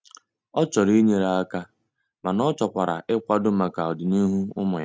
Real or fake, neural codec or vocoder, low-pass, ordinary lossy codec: real; none; none; none